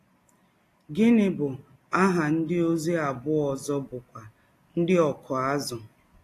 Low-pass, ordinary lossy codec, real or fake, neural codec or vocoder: 14.4 kHz; AAC, 48 kbps; real; none